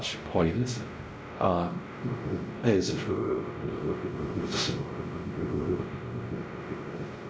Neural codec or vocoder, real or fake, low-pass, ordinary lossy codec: codec, 16 kHz, 0.5 kbps, X-Codec, WavLM features, trained on Multilingual LibriSpeech; fake; none; none